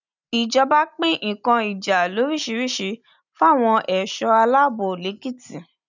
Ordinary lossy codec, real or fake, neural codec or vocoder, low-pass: none; real; none; 7.2 kHz